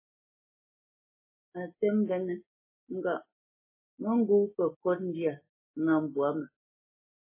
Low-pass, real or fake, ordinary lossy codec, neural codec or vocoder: 3.6 kHz; real; MP3, 16 kbps; none